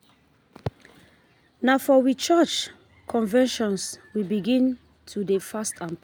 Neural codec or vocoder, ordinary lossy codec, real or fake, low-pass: none; none; real; none